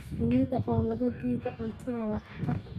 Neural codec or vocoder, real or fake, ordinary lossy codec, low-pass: codec, 44.1 kHz, 2.6 kbps, DAC; fake; none; 14.4 kHz